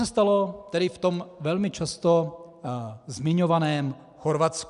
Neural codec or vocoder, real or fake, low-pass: none; real; 10.8 kHz